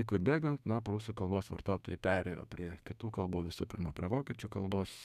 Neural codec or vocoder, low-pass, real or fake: codec, 32 kHz, 1.9 kbps, SNAC; 14.4 kHz; fake